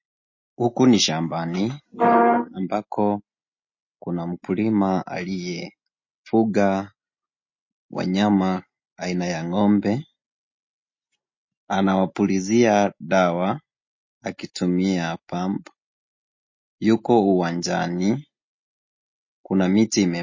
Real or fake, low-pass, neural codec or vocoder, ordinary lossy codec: real; 7.2 kHz; none; MP3, 32 kbps